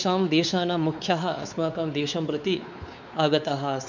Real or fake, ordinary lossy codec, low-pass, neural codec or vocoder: fake; none; 7.2 kHz; codec, 16 kHz, 4 kbps, X-Codec, WavLM features, trained on Multilingual LibriSpeech